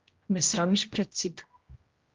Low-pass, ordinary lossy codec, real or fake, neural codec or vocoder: 7.2 kHz; Opus, 32 kbps; fake; codec, 16 kHz, 0.5 kbps, X-Codec, HuBERT features, trained on general audio